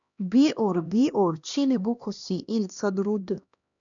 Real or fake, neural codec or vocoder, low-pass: fake; codec, 16 kHz, 1 kbps, X-Codec, HuBERT features, trained on LibriSpeech; 7.2 kHz